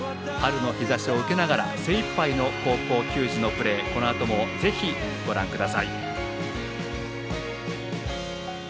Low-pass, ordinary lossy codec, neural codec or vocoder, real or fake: none; none; none; real